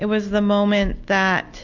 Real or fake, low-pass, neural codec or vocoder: real; 7.2 kHz; none